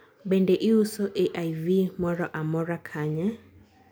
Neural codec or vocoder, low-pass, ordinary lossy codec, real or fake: none; none; none; real